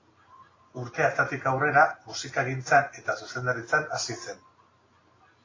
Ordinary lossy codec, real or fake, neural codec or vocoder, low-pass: AAC, 32 kbps; real; none; 7.2 kHz